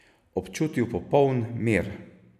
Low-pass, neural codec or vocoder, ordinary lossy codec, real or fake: 14.4 kHz; none; none; real